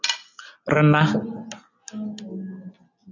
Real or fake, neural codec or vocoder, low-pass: real; none; 7.2 kHz